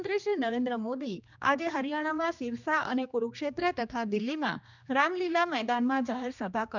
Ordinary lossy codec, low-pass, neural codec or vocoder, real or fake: none; 7.2 kHz; codec, 16 kHz, 2 kbps, X-Codec, HuBERT features, trained on general audio; fake